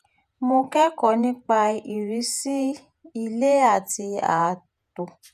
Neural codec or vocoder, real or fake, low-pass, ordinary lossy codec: vocoder, 48 kHz, 128 mel bands, Vocos; fake; 14.4 kHz; none